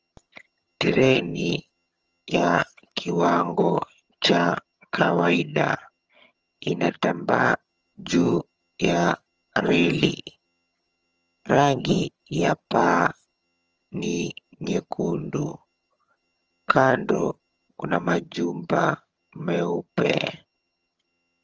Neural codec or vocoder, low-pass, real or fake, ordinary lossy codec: vocoder, 22.05 kHz, 80 mel bands, HiFi-GAN; 7.2 kHz; fake; Opus, 24 kbps